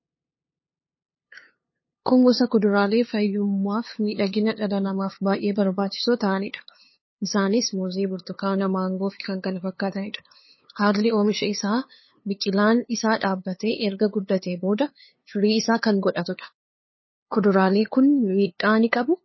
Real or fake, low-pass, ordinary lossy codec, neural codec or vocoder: fake; 7.2 kHz; MP3, 24 kbps; codec, 16 kHz, 2 kbps, FunCodec, trained on LibriTTS, 25 frames a second